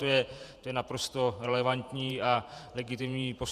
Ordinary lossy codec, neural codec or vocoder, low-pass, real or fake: Opus, 64 kbps; vocoder, 48 kHz, 128 mel bands, Vocos; 14.4 kHz; fake